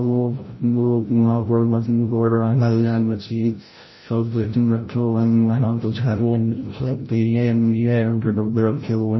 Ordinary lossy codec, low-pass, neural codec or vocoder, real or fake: MP3, 24 kbps; 7.2 kHz; codec, 16 kHz, 0.5 kbps, FreqCodec, larger model; fake